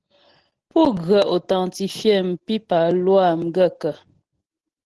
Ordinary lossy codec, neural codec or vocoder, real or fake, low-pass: Opus, 16 kbps; none; real; 10.8 kHz